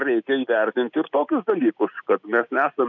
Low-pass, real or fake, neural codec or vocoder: 7.2 kHz; real; none